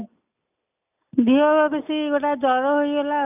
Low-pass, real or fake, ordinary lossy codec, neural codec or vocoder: 3.6 kHz; real; none; none